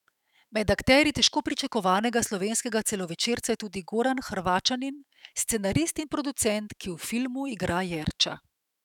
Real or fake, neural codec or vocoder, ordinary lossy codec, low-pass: fake; autoencoder, 48 kHz, 128 numbers a frame, DAC-VAE, trained on Japanese speech; none; 19.8 kHz